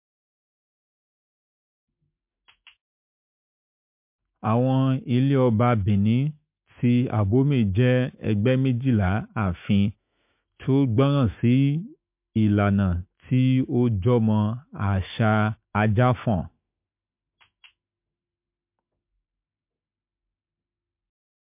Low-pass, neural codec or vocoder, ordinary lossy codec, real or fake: 3.6 kHz; autoencoder, 48 kHz, 128 numbers a frame, DAC-VAE, trained on Japanese speech; MP3, 32 kbps; fake